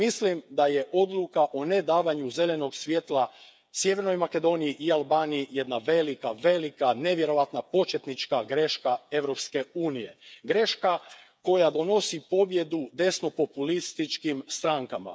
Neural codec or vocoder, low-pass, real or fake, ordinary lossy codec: codec, 16 kHz, 8 kbps, FreqCodec, smaller model; none; fake; none